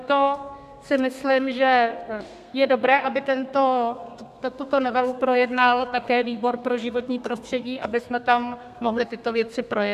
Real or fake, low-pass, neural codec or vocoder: fake; 14.4 kHz; codec, 32 kHz, 1.9 kbps, SNAC